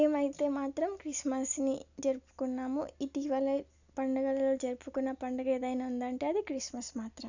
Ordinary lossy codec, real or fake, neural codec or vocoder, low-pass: none; real; none; 7.2 kHz